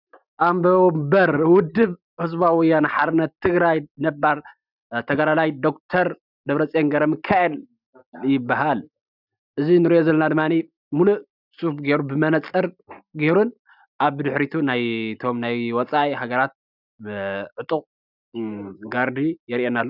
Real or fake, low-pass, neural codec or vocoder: real; 5.4 kHz; none